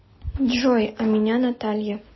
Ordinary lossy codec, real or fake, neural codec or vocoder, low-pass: MP3, 24 kbps; real; none; 7.2 kHz